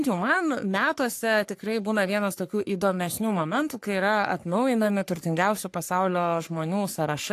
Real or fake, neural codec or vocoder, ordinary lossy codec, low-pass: fake; codec, 44.1 kHz, 3.4 kbps, Pupu-Codec; AAC, 64 kbps; 14.4 kHz